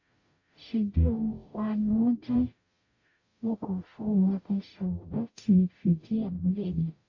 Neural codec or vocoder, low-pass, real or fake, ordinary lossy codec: codec, 44.1 kHz, 0.9 kbps, DAC; 7.2 kHz; fake; AAC, 48 kbps